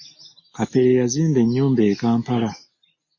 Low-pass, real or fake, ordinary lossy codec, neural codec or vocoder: 7.2 kHz; real; MP3, 32 kbps; none